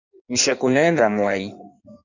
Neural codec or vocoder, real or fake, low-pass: codec, 16 kHz in and 24 kHz out, 1.1 kbps, FireRedTTS-2 codec; fake; 7.2 kHz